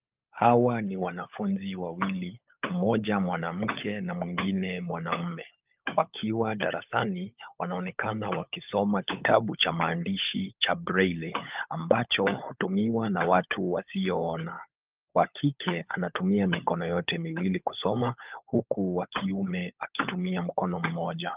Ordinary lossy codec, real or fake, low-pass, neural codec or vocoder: Opus, 24 kbps; fake; 3.6 kHz; codec, 16 kHz, 16 kbps, FunCodec, trained on LibriTTS, 50 frames a second